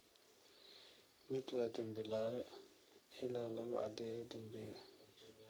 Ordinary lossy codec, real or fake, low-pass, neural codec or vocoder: none; fake; none; codec, 44.1 kHz, 3.4 kbps, Pupu-Codec